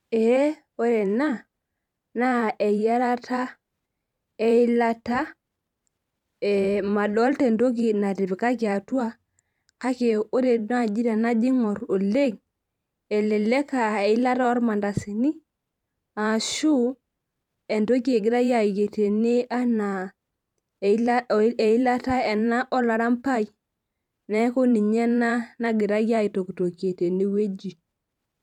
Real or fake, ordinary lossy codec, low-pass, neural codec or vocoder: fake; none; 19.8 kHz; vocoder, 44.1 kHz, 128 mel bands every 512 samples, BigVGAN v2